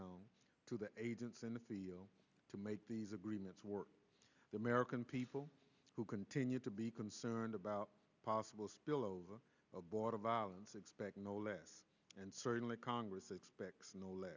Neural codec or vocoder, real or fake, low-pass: none; real; 7.2 kHz